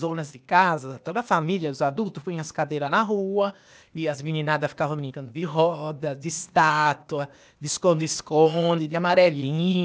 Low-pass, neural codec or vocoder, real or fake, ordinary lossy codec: none; codec, 16 kHz, 0.8 kbps, ZipCodec; fake; none